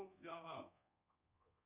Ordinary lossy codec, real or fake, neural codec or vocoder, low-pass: Opus, 64 kbps; fake; codec, 24 kHz, 1.2 kbps, DualCodec; 3.6 kHz